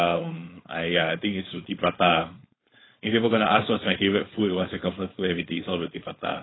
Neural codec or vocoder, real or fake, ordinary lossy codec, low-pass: codec, 16 kHz, 4.8 kbps, FACodec; fake; AAC, 16 kbps; 7.2 kHz